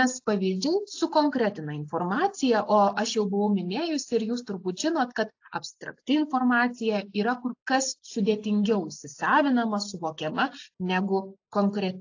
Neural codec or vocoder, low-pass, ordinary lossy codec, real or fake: none; 7.2 kHz; AAC, 48 kbps; real